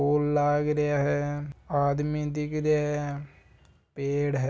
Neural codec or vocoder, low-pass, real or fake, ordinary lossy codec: none; none; real; none